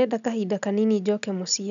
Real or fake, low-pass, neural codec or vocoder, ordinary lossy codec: real; 7.2 kHz; none; none